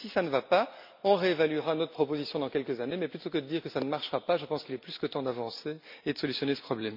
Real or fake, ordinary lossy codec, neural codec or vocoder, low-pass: real; MP3, 48 kbps; none; 5.4 kHz